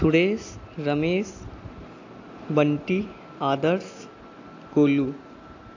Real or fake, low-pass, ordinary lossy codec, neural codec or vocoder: real; 7.2 kHz; AAC, 48 kbps; none